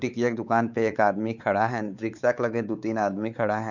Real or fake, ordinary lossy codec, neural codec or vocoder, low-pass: fake; none; codec, 16 kHz, 4 kbps, X-Codec, WavLM features, trained on Multilingual LibriSpeech; 7.2 kHz